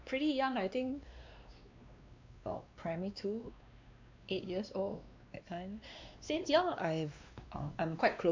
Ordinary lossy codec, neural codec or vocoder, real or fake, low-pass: MP3, 64 kbps; codec, 16 kHz, 2 kbps, X-Codec, WavLM features, trained on Multilingual LibriSpeech; fake; 7.2 kHz